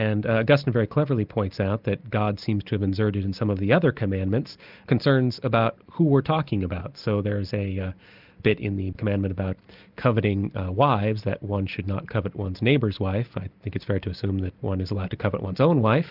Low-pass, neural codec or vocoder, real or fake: 5.4 kHz; none; real